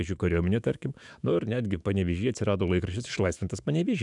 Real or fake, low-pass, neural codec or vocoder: fake; 10.8 kHz; autoencoder, 48 kHz, 128 numbers a frame, DAC-VAE, trained on Japanese speech